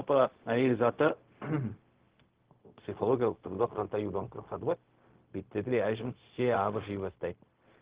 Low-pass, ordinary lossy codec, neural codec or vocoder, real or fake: 3.6 kHz; Opus, 16 kbps; codec, 16 kHz, 0.4 kbps, LongCat-Audio-Codec; fake